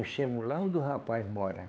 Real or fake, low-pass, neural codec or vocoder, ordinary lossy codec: fake; none; codec, 16 kHz, 4 kbps, X-Codec, HuBERT features, trained on LibriSpeech; none